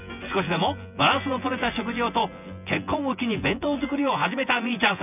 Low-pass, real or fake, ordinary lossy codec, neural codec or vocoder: 3.6 kHz; fake; none; vocoder, 24 kHz, 100 mel bands, Vocos